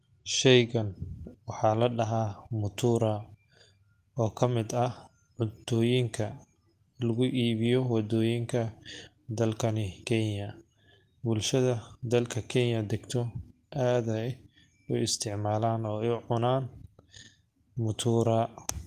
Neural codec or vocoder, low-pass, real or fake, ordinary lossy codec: none; 14.4 kHz; real; Opus, 24 kbps